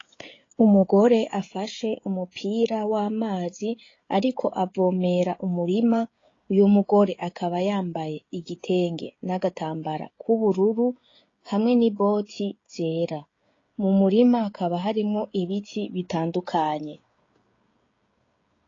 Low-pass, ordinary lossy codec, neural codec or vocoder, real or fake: 7.2 kHz; AAC, 32 kbps; codec, 16 kHz, 16 kbps, FreqCodec, smaller model; fake